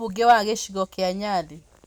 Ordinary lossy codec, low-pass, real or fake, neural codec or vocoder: none; none; real; none